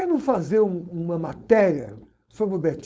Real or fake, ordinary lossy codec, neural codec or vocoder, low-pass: fake; none; codec, 16 kHz, 4.8 kbps, FACodec; none